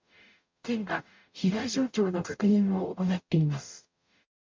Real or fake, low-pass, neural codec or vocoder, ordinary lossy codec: fake; 7.2 kHz; codec, 44.1 kHz, 0.9 kbps, DAC; MP3, 48 kbps